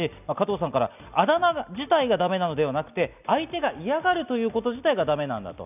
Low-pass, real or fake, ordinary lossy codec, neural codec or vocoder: 3.6 kHz; real; none; none